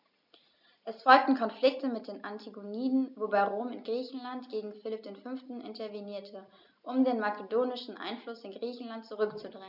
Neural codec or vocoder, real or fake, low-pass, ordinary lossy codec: none; real; 5.4 kHz; none